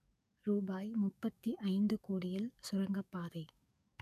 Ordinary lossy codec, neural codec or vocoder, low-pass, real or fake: none; codec, 44.1 kHz, 7.8 kbps, DAC; 14.4 kHz; fake